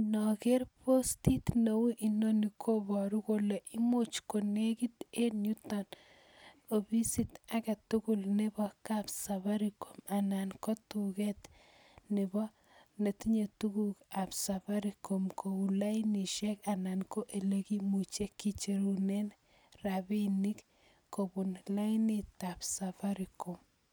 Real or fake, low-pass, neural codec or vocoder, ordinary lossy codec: real; none; none; none